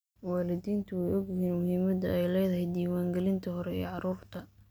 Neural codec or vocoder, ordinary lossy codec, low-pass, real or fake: none; none; none; real